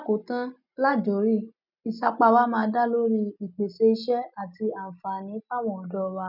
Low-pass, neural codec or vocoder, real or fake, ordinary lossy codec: 5.4 kHz; none; real; none